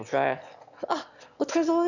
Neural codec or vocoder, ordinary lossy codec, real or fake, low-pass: autoencoder, 22.05 kHz, a latent of 192 numbers a frame, VITS, trained on one speaker; none; fake; 7.2 kHz